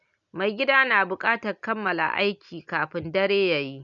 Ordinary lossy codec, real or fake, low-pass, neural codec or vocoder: none; real; 7.2 kHz; none